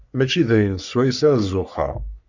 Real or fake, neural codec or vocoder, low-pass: fake; codec, 44.1 kHz, 3.4 kbps, Pupu-Codec; 7.2 kHz